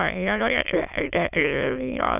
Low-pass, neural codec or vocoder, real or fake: 3.6 kHz; autoencoder, 22.05 kHz, a latent of 192 numbers a frame, VITS, trained on many speakers; fake